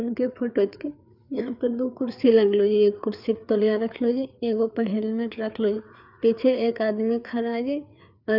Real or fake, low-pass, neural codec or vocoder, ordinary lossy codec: fake; 5.4 kHz; codec, 16 kHz, 4 kbps, FreqCodec, larger model; none